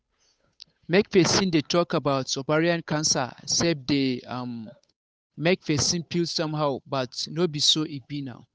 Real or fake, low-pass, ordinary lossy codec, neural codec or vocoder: fake; none; none; codec, 16 kHz, 8 kbps, FunCodec, trained on Chinese and English, 25 frames a second